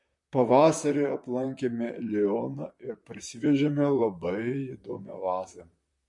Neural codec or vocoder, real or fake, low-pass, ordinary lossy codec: vocoder, 44.1 kHz, 128 mel bands, Pupu-Vocoder; fake; 10.8 kHz; MP3, 48 kbps